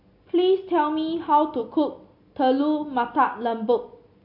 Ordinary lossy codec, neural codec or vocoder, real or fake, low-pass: MP3, 32 kbps; none; real; 5.4 kHz